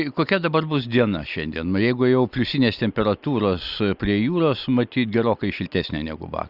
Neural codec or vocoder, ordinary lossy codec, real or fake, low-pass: none; Opus, 64 kbps; real; 5.4 kHz